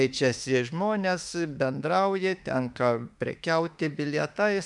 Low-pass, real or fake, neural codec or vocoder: 10.8 kHz; fake; autoencoder, 48 kHz, 32 numbers a frame, DAC-VAE, trained on Japanese speech